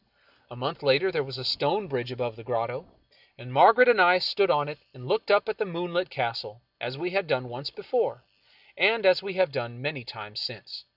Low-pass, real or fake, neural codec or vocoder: 5.4 kHz; real; none